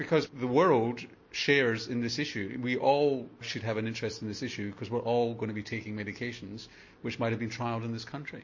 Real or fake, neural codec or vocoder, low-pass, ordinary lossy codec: real; none; 7.2 kHz; MP3, 32 kbps